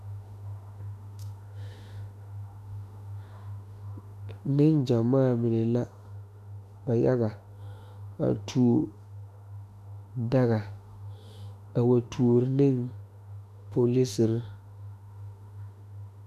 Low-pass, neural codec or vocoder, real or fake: 14.4 kHz; autoencoder, 48 kHz, 32 numbers a frame, DAC-VAE, trained on Japanese speech; fake